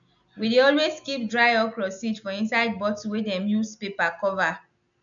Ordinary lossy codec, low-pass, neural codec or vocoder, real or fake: none; 7.2 kHz; none; real